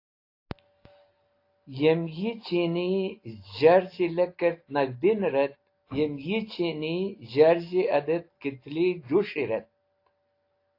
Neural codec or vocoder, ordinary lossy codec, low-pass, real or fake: none; AAC, 32 kbps; 5.4 kHz; real